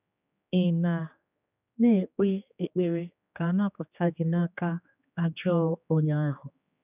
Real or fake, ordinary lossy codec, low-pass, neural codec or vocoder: fake; none; 3.6 kHz; codec, 16 kHz, 2 kbps, X-Codec, HuBERT features, trained on general audio